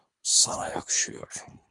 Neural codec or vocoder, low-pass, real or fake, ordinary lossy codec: codec, 24 kHz, 3 kbps, HILCodec; 10.8 kHz; fake; MP3, 64 kbps